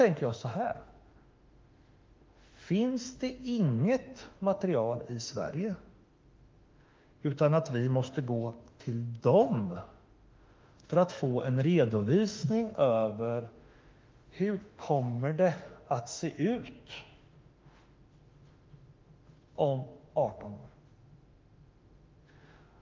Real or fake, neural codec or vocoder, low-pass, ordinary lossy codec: fake; autoencoder, 48 kHz, 32 numbers a frame, DAC-VAE, trained on Japanese speech; 7.2 kHz; Opus, 32 kbps